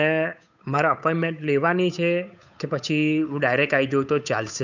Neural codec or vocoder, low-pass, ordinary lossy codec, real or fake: codec, 16 kHz, 8 kbps, FunCodec, trained on Chinese and English, 25 frames a second; 7.2 kHz; none; fake